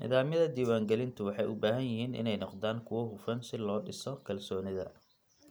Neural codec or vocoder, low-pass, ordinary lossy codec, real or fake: none; none; none; real